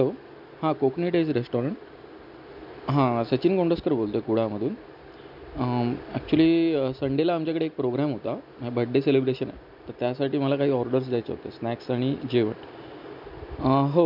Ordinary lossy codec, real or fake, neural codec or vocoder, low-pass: MP3, 48 kbps; real; none; 5.4 kHz